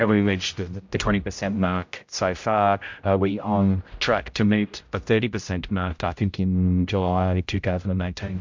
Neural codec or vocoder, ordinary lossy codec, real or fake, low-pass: codec, 16 kHz, 0.5 kbps, X-Codec, HuBERT features, trained on general audio; MP3, 64 kbps; fake; 7.2 kHz